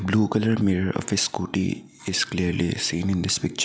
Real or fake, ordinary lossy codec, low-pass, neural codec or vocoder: real; none; none; none